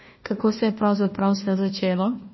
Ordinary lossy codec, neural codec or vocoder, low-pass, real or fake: MP3, 24 kbps; codec, 16 kHz, 1 kbps, FunCodec, trained on Chinese and English, 50 frames a second; 7.2 kHz; fake